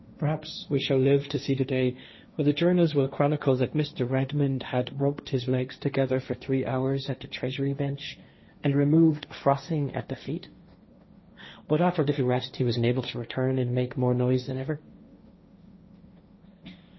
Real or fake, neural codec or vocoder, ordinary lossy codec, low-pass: fake; codec, 16 kHz, 1.1 kbps, Voila-Tokenizer; MP3, 24 kbps; 7.2 kHz